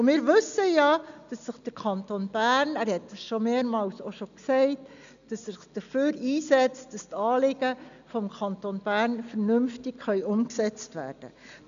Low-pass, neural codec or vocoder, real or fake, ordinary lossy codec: 7.2 kHz; none; real; none